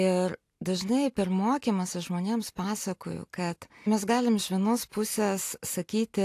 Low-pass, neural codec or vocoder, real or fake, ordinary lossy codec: 14.4 kHz; none; real; AAC, 64 kbps